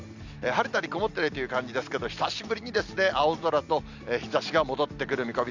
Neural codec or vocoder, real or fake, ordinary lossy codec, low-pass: none; real; Opus, 64 kbps; 7.2 kHz